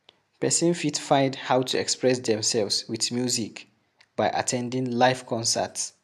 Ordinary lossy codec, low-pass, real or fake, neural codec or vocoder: MP3, 96 kbps; 14.4 kHz; real; none